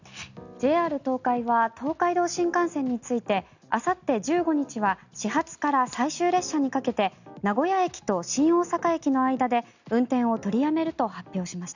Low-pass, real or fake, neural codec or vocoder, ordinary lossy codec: 7.2 kHz; real; none; none